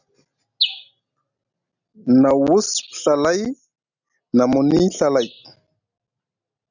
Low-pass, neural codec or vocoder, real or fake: 7.2 kHz; none; real